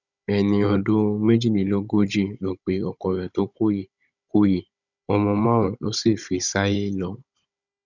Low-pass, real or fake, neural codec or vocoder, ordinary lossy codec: 7.2 kHz; fake; codec, 16 kHz, 16 kbps, FunCodec, trained on Chinese and English, 50 frames a second; none